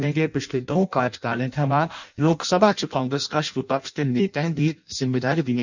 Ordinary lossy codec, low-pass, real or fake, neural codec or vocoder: none; 7.2 kHz; fake; codec, 16 kHz in and 24 kHz out, 0.6 kbps, FireRedTTS-2 codec